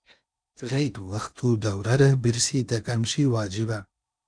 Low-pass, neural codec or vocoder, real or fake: 9.9 kHz; codec, 16 kHz in and 24 kHz out, 0.6 kbps, FocalCodec, streaming, 4096 codes; fake